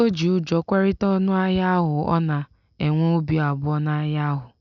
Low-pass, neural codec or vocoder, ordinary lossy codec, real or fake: 7.2 kHz; none; none; real